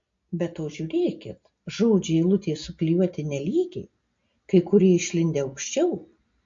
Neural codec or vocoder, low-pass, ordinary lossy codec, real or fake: none; 7.2 kHz; MP3, 48 kbps; real